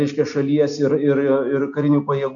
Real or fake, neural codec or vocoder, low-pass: real; none; 7.2 kHz